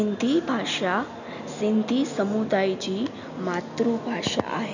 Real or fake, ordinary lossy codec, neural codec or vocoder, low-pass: real; none; none; 7.2 kHz